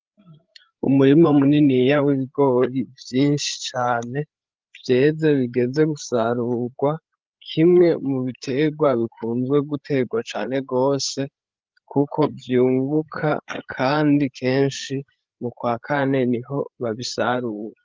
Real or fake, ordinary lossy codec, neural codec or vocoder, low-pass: fake; Opus, 32 kbps; codec, 16 kHz, 8 kbps, FreqCodec, larger model; 7.2 kHz